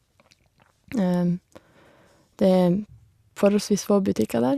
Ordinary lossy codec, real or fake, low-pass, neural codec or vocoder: Opus, 64 kbps; real; 14.4 kHz; none